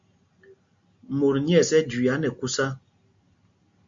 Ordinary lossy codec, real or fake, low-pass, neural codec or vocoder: MP3, 48 kbps; real; 7.2 kHz; none